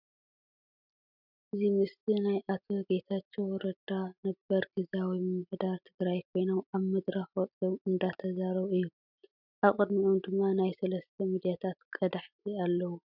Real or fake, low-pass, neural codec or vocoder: real; 5.4 kHz; none